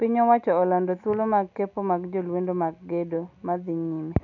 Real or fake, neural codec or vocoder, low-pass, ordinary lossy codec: real; none; 7.2 kHz; none